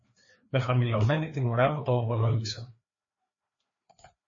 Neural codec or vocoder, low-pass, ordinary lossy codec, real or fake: codec, 16 kHz, 2 kbps, FreqCodec, larger model; 7.2 kHz; MP3, 32 kbps; fake